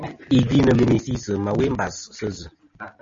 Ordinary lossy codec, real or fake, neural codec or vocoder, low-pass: MP3, 32 kbps; real; none; 7.2 kHz